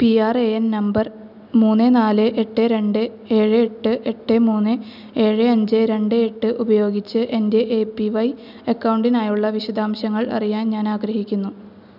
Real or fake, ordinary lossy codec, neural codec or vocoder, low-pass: real; none; none; 5.4 kHz